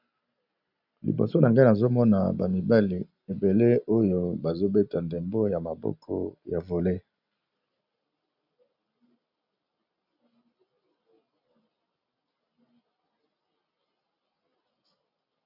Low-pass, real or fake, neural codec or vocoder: 5.4 kHz; real; none